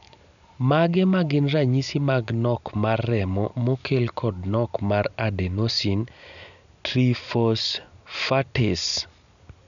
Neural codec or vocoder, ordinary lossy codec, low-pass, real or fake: none; none; 7.2 kHz; real